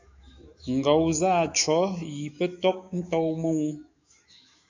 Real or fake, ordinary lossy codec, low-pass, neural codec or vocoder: fake; AAC, 48 kbps; 7.2 kHz; autoencoder, 48 kHz, 128 numbers a frame, DAC-VAE, trained on Japanese speech